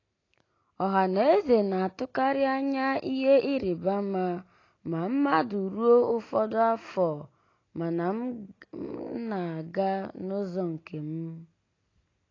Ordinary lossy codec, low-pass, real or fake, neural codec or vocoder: AAC, 32 kbps; 7.2 kHz; real; none